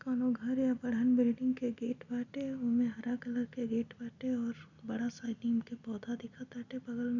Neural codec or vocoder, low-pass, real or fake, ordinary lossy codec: none; 7.2 kHz; real; none